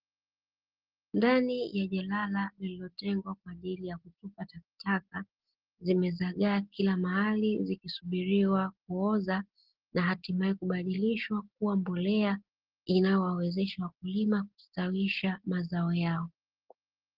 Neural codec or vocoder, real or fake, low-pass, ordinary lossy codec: none; real; 5.4 kHz; Opus, 16 kbps